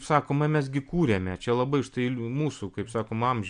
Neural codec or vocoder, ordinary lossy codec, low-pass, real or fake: none; MP3, 96 kbps; 9.9 kHz; real